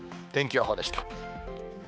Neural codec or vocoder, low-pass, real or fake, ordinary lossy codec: codec, 16 kHz, 4 kbps, X-Codec, HuBERT features, trained on balanced general audio; none; fake; none